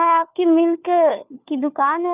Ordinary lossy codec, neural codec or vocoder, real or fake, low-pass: none; codec, 16 kHz, 4 kbps, FunCodec, trained on LibriTTS, 50 frames a second; fake; 3.6 kHz